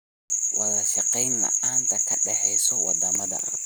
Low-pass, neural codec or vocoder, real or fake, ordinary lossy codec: none; none; real; none